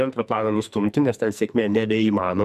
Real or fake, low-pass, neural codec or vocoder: fake; 14.4 kHz; codec, 44.1 kHz, 2.6 kbps, SNAC